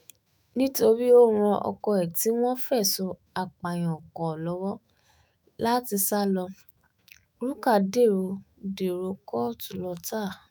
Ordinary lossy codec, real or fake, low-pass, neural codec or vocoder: none; fake; none; autoencoder, 48 kHz, 128 numbers a frame, DAC-VAE, trained on Japanese speech